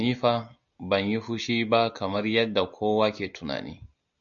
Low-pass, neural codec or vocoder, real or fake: 7.2 kHz; none; real